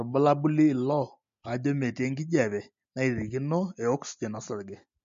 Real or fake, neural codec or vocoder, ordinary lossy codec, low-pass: real; none; MP3, 48 kbps; 7.2 kHz